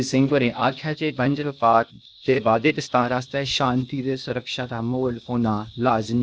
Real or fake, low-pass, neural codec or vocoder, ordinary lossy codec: fake; none; codec, 16 kHz, 0.8 kbps, ZipCodec; none